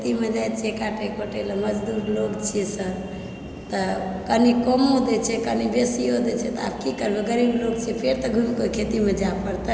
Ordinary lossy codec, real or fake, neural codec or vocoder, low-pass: none; real; none; none